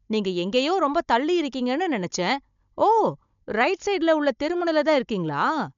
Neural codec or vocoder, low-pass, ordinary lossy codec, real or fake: codec, 16 kHz, 16 kbps, FunCodec, trained on Chinese and English, 50 frames a second; 7.2 kHz; MP3, 64 kbps; fake